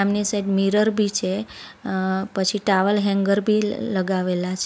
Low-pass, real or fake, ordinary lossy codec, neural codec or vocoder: none; real; none; none